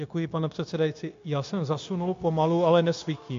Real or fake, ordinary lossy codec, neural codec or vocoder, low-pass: fake; AAC, 48 kbps; codec, 16 kHz, 0.9 kbps, LongCat-Audio-Codec; 7.2 kHz